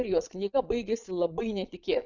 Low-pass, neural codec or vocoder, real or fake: 7.2 kHz; vocoder, 22.05 kHz, 80 mel bands, Vocos; fake